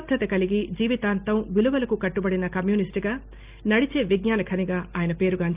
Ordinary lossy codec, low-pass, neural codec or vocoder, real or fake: Opus, 24 kbps; 3.6 kHz; none; real